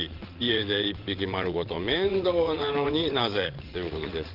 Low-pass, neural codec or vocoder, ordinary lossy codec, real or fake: 5.4 kHz; vocoder, 22.05 kHz, 80 mel bands, WaveNeXt; Opus, 16 kbps; fake